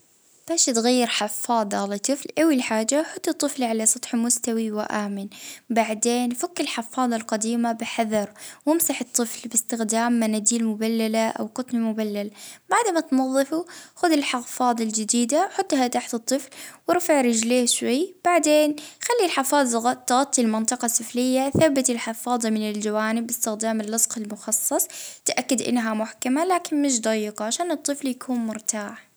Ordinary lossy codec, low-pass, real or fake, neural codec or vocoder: none; none; real; none